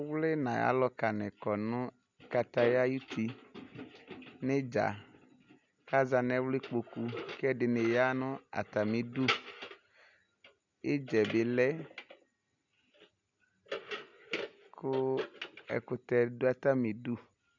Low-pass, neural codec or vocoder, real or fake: 7.2 kHz; none; real